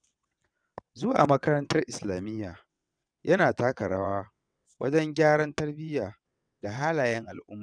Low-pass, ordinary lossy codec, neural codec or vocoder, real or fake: 9.9 kHz; none; vocoder, 22.05 kHz, 80 mel bands, WaveNeXt; fake